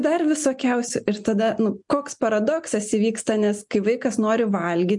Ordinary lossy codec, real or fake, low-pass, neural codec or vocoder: MP3, 64 kbps; real; 10.8 kHz; none